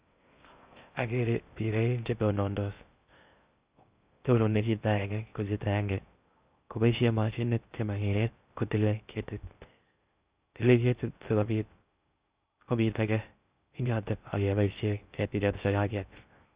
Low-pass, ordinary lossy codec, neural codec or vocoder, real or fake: 3.6 kHz; Opus, 64 kbps; codec, 16 kHz in and 24 kHz out, 0.6 kbps, FocalCodec, streaming, 2048 codes; fake